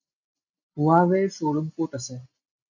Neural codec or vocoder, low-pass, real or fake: none; 7.2 kHz; real